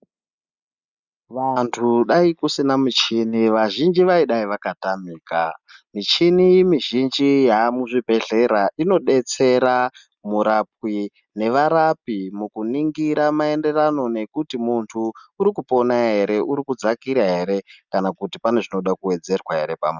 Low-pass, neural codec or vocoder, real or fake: 7.2 kHz; none; real